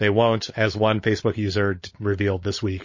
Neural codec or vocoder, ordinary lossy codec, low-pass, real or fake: codec, 16 kHz, 16 kbps, FunCodec, trained on LibriTTS, 50 frames a second; MP3, 32 kbps; 7.2 kHz; fake